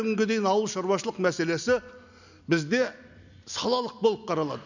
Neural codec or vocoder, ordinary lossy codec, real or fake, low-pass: none; none; real; 7.2 kHz